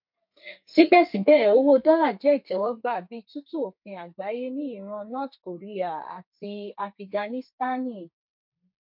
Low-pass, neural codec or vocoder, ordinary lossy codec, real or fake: 5.4 kHz; codec, 32 kHz, 1.9 kbps, SNAC; MP3, 48 kbps; fake